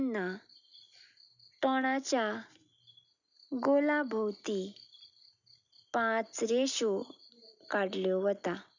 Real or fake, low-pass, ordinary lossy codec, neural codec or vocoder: real; 7.2 kHz; none; none